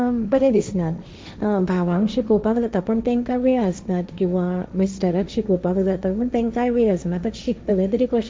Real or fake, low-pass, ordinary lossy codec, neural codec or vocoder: fake; none; none; codec, 16 kHz, 1.1 kbps, Voila-Tokenizer